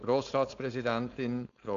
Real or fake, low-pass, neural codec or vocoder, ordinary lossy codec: fake; 7.2 kHz; codec, 16 kHz, 4.8 kbps, FACodec; AAC, 64 kbps